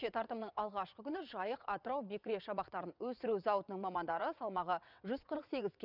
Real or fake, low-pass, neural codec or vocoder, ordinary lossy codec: fake; 5.4 kHz; vocoder, 22.05 kHz, 80 mel bands, WaveNeXt; none